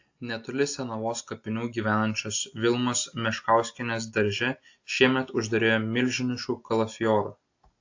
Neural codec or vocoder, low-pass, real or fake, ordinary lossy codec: none; 7.2 kHz; real; AAC, 48 kbps